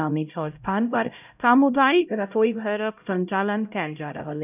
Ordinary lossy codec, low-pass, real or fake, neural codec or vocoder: none; 3.6 kHz; fake; codec, 16 kHz, 0.5 kbps, X-Codec, HuBERT features, trained on LibriSpeech